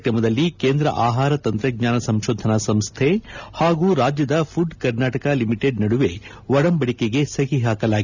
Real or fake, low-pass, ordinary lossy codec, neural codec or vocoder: real; 7.2 kHz; none; none